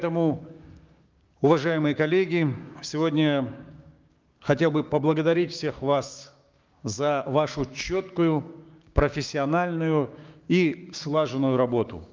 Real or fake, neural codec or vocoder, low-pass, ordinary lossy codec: fake; codec, 16 kHz, 6 kbps, DAC; 7.2 kHz; Opus, 24 kbps